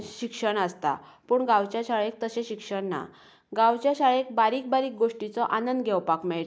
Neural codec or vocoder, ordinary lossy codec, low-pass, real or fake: none; none; none; real